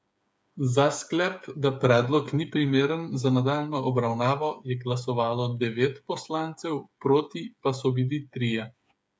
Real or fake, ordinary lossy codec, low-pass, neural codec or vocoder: fake; none; none; codec, 16 kHz, 8 kbps, FreqCodec, smaller model